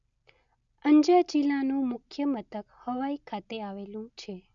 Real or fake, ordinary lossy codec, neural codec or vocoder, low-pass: real; none; none; 7.2 kHz